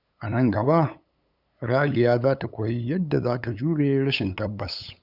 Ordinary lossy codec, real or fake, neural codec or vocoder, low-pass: none; fake; codec, 16 kHz, 8 kbps, FunCodec, trained on LibriTTS, 25 frames a second; 5.4 kHz